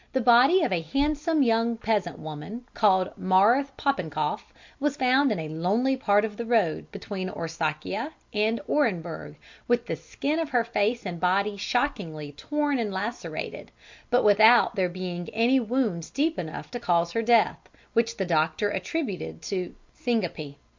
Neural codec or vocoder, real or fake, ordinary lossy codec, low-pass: none; real; MP3, 64 kbps; 7.2 kHz